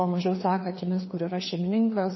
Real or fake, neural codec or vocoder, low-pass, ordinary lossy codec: fake; codec, 16 kHz, 2 kbps, FreqCodec, larger model; 7.2 kHz; MP3, 24 kbps